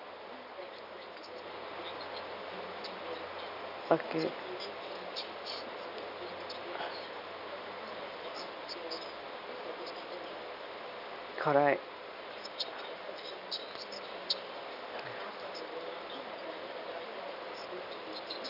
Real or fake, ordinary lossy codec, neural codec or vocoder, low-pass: real; none; none; 5.4 kHz